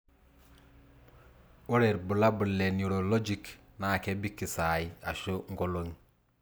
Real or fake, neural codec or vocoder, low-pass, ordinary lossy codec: real; none; none; none